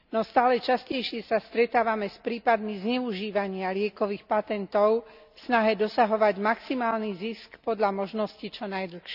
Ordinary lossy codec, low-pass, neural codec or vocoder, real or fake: none; 5.4 kHz; none; real